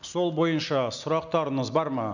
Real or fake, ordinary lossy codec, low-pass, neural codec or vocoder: real; none; 7.2 kHz; none